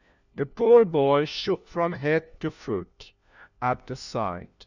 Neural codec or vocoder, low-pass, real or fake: codec, 16 kHz, 1 kbps, FunCodec, trained on LibriTTS, 50 frames a second; 7.2 kHz; fake